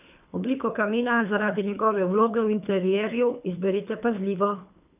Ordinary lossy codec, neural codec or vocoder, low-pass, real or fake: none; codec, 24 kHz, 3 kbps, HILCodec; 3.6 kHz; fake